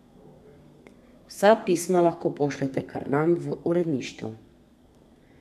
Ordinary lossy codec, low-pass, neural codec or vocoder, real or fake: none; 14.4 kHz; codec, 32 kHz, 1.9 kbps, SNAC; fake